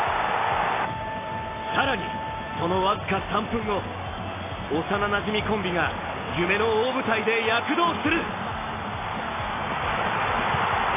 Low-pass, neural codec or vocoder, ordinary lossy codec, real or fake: 3.6 kHz; none; AAC, 16 kbps; real